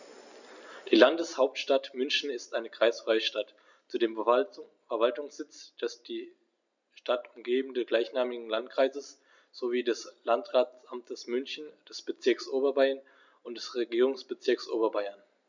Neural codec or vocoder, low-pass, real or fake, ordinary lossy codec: none; 7.2 kHz; real; none